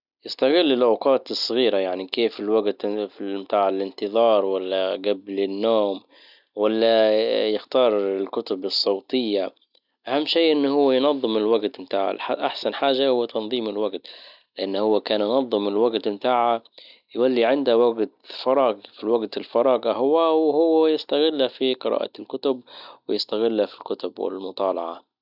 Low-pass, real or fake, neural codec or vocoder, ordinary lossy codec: 5.4 kHz; real; none; none